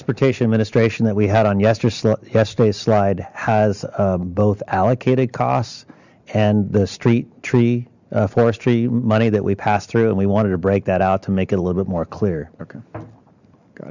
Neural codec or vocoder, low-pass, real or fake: none; 7.2 kHz; real